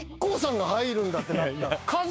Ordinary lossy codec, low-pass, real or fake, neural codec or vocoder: none; none; fake; codec, 16 kHz, 6 kbps, DAC